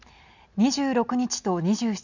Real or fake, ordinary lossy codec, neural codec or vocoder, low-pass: real; none; none; 7.2 kHz